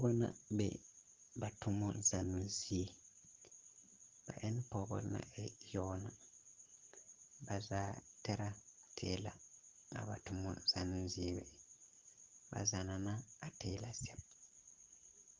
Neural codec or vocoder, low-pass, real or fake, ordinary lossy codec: none; 7.2 kHz; real; Opus, 16 kbps